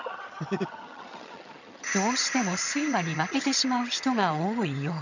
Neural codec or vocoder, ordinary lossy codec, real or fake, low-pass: vocoder, 22.05 kHz, 80 mel bands, HiFi-GAN; none; fake; 7.2 kHz